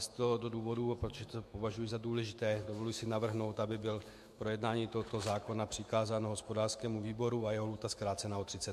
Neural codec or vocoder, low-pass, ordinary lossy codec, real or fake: autoencoder, 48 kHz, 128 numbers a frame, DAC-VAE, trained on Japanese speech; 14.4 kHz; MP3, 64 kbps; fake